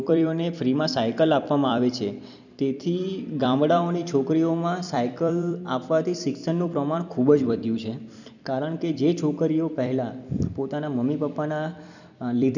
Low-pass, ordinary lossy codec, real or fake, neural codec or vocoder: 7.2 kHz; none; fake; vocoder, 44.1 kHz, 128 mel bands every 256 samples, BigVGAN v2